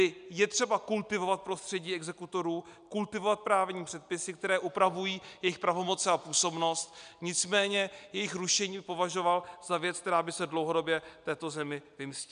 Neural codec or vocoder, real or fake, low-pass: none; real; 9.9 kHz